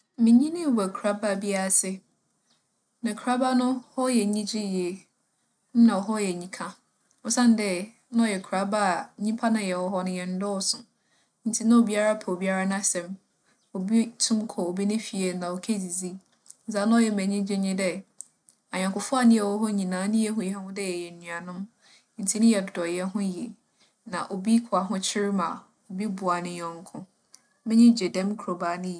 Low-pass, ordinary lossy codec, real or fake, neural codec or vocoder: 9.9 kHz; MP3, 96 kbps; real; none